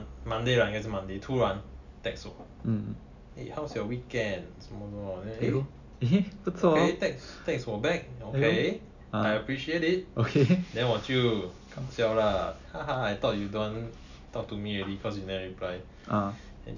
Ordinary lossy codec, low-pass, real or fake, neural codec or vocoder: none; 7.2 kHz; real; none